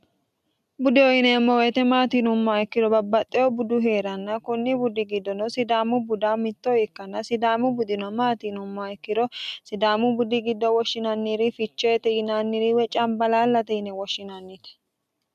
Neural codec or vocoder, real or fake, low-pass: none; real; 14.4 kHz